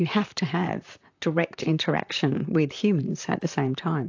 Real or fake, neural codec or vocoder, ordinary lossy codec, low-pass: fake; vocoder, 44.1 kHz, 128 mel bands, Pupu-Vocoder; AAC, 48 kbps; 7.2 kHz